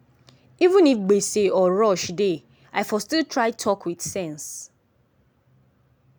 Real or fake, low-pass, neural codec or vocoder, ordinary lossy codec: real; none; none; none